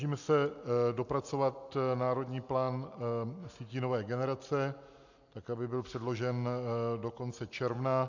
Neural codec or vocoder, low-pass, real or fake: none; 7.2 kHz; real